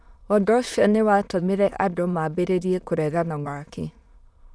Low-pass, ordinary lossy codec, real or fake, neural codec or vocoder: none; none; fake; autoencoder, 22.05 kHz, a latent of 192 numbers a frame, VITS, trained on many speakers